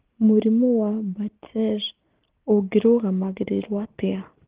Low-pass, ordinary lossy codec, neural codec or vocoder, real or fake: 3.6 kHz; Opus, 16 kbps; none; real